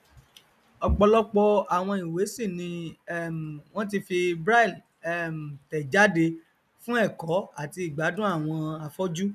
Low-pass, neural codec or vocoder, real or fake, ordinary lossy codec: 14.4 kHz; none; real; none